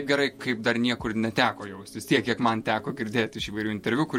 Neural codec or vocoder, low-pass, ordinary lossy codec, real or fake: vocoder, 48 kHz, 128 mel bands, Vocos; 19.8 kHz; MP3, 64 kbps; fake